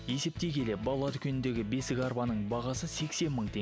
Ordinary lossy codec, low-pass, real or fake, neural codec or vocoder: none; none; real; none